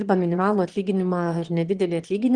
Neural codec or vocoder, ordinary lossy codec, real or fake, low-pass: autoencoder, 22.05 kHz, a latent of 192 numbers a frame, VITS, trained on one speaker; Opus, 16 kbps; fake; 9.9 kHz